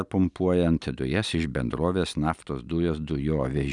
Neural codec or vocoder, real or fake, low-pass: none; real; 10.8 kHz